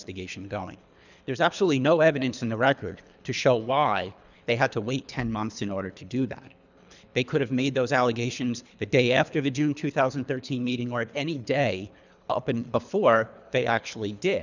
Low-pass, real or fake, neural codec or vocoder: 7.2 kHz; fake; codec, 24 kHz, 3 kbps, HILCodec